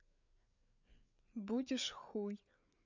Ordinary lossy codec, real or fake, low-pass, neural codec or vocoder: none; fake; 7.2 kHz; codec, 16 kHz, 4 kbps, FreqCodec, larger model